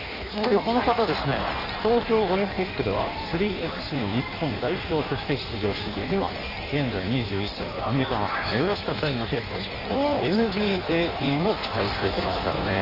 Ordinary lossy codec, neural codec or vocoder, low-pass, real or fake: none; codec, 16 kHz in and 24 kHz out, 1.1 kbps, FireRedTTS-2 codec; 5.4 kHz; fake